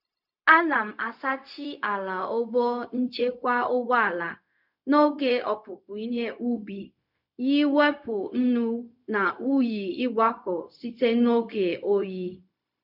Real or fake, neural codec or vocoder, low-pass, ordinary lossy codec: fake; codec, 16 kHz, 0.4 kbps, LongCat-Audio-Codec; 5.4 kHz; none